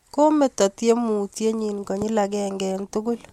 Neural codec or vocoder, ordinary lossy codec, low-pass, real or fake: none; MP3, 64 kbps; 19.8 kHz; real